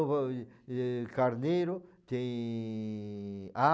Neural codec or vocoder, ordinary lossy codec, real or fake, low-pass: none; none; real; none